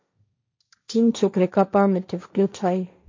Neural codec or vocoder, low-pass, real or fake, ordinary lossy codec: codec, 16 kHz, 1.1 kbps, Voila-Tokenizer; 7.2 kHz; fake; MP3, 48 kbps